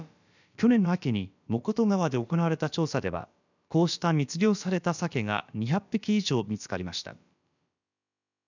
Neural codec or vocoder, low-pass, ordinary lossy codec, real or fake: codec, 16 kHz, about 1 kbps, DyCAST, with the encoder's durations; 7.2 kHz; none; fake